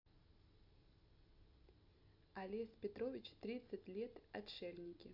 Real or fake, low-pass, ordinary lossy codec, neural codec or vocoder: real; 5.4 kHz; none; none